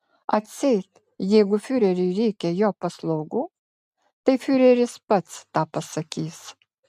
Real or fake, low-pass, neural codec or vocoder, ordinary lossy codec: real; 14.4 kHz; none; MP3, 96 kbps